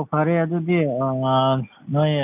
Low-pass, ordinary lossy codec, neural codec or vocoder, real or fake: 3.6 kHz; none; none; real